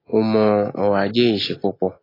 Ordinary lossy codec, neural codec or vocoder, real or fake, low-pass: AAC, 24 kbps; none; real; 5.4 kHz